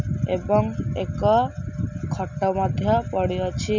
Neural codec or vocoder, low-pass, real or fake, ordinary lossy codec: none; 7.2 kHz; real; none